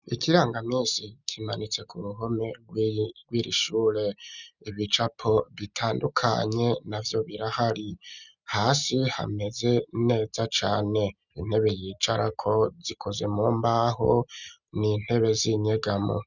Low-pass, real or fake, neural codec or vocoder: 7.2 kHz; real; none